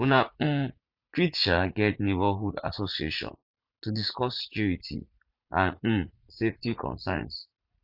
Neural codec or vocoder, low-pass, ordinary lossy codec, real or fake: vocoder, 44.1 kHz, 80 mel bands, Vocos; 5.4 kHz; Opus, 64 kbps; fake